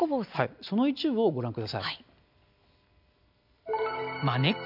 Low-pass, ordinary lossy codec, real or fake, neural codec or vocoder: 5.4 kHz; none; real; none